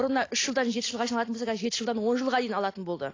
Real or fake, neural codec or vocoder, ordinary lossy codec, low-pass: real; none; AAC, 32 kbps; 7.2 kHz